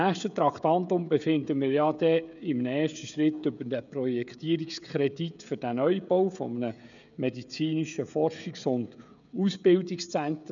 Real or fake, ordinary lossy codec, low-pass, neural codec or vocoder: fake; none; 7.2 kHz; codec, 16 kHz, 16 kbps, FreqCodec, smaller model